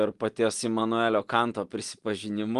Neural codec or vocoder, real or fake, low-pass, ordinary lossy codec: none; real; 10.8 kHz; Opus, 24 kbps